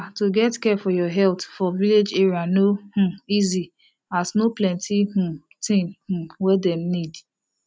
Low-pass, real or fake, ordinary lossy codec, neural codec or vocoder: none; real; none; none